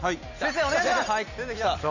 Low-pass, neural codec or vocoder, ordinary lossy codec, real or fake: 7.2 kHz; none; none; real